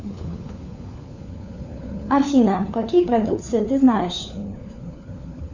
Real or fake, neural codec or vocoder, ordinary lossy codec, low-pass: fake; codec, 16 kHz, 4 kbps, FunCodec, trained on LibriTTS, 50 frames a second; Opus, 64 kbps; 7.2 kHz